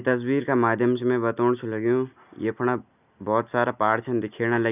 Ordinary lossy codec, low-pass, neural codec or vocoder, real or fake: none; 3.6 kHz; none; real